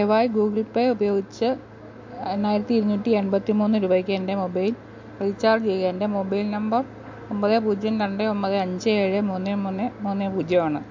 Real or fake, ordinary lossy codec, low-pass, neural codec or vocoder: real; MP3, 48 kbps; 7.2 kHz; none